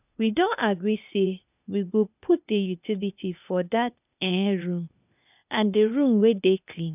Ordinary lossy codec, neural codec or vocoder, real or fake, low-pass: none; codec, 16 kHz, 0.8 kbps, ZipCodec; fake; 3.6 kHz